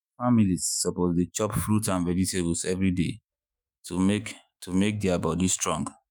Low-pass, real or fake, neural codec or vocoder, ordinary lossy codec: none; fake; autoencoder, 48 kHz, 128 numbers a frame, DAC-VAE, trained on Japanese speech; none